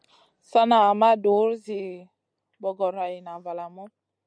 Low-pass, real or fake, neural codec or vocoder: 9.9 kHz; real; none